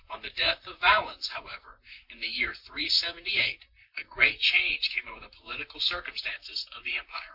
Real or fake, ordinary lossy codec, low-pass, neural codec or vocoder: fake; MP3, 48 kbps; 5.4 kHz; vocoder, 44.1 kHz, 128 mel bands, Pupu-Vocoder